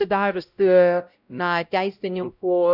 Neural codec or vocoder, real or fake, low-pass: codec, 16 kHz, 0.5 kbps, X-Codec, WavLM features, trained on Multilingual LibriSpeech; fake; 5.4 kHz